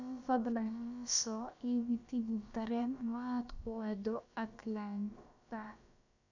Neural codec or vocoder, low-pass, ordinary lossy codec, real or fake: codec, 16 kHz, about 1 kbps, DyCAST, with the encoder's durations; 7.2 kHz; none; fake